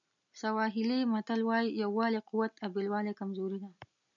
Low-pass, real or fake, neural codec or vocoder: 7.2 kHz; real; none